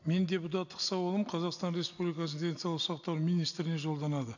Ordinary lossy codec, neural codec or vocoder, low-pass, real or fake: none; none; 7.2 kHz; real